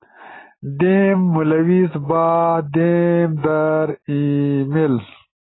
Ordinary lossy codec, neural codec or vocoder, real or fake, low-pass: AAC, 16 kbps; none; real; 7.2 kHz